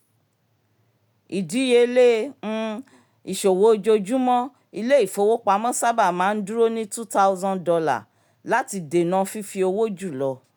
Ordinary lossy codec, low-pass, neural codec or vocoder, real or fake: none; none; none; real